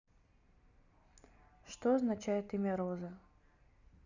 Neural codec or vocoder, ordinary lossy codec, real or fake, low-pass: none; none; real; 7.2 kHz